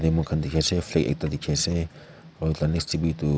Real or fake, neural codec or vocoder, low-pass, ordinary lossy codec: real; none; none; none